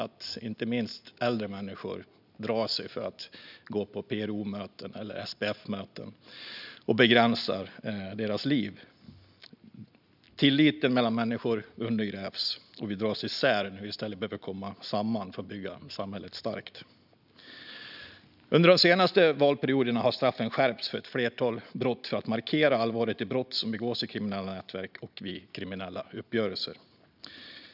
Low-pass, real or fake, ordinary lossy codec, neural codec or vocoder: 5.4 kHz; real; none; none